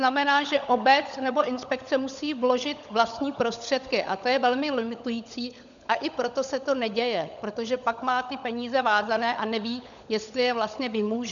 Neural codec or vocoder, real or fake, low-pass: codec, 16 kHz, 16 kbps, FunCodec, trained on LibriTTS, 50 frames a second; fake; 7.2 kHz